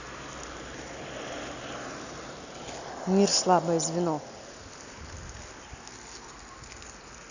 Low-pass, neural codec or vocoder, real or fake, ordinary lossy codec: 7.2 kHz; none; real; none